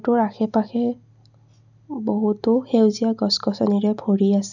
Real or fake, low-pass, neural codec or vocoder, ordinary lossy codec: real; 7.2 kHz; none; none